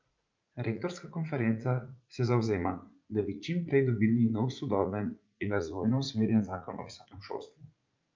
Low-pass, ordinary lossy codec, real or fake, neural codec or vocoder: 7.2 kHz; Opus, 24 kbps; fake; vocoder, 44.1 kHz, 80 mel bands, Vocos